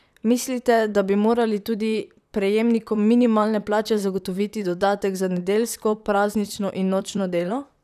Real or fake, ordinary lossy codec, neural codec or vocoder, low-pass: fake; none; vocoder, 44.1 kHz, 128 mel bands, Pupu-Vocoder; 14.4 kHz